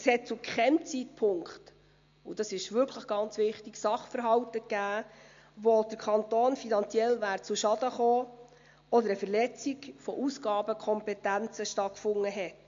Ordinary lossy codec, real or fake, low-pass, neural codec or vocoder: MP3, 48 kbps; real; 7.2 kHz; none